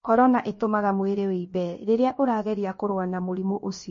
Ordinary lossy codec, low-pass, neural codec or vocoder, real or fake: MP3, 32 kbps; 7.2 kHz; codec, 16 kHz, about 1 kbps, DyCAST, with the encoder's durations; fake